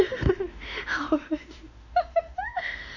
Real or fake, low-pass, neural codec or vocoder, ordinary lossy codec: fake; 7.2 kHz; autoencoder, 48 kHz, 32 numbers a frame, DAC-VAE, trained on Japanese speech; none